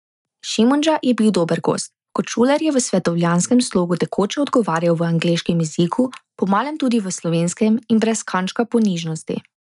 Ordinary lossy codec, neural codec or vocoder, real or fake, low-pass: none; none; real; 10.8 kHz